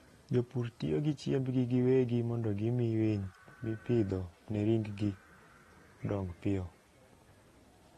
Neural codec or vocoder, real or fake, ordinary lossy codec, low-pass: none; real; AAC, 32 kbps; 19.8 kHz